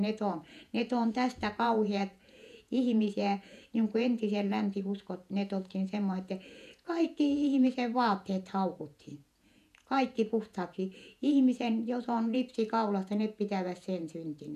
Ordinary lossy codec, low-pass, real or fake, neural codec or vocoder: none; 14.4 kHz; fake; vocoder, 48 kHz, 128 mel bands, Vocos